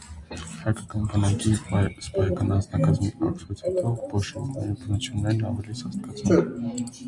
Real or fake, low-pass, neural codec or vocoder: real; 10.8 kHz; none